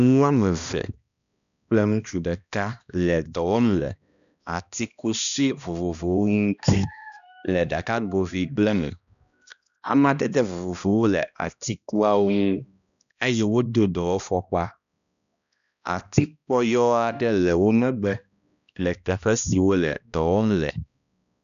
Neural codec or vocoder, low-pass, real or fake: codec, 16 kHz, 1 kbps, X-Codec, HuBERT features, trained on balanced general audio; 7.2 kHz; fake